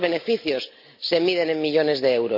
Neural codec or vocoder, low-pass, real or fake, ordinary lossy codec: none; 5.4 kHz; real; none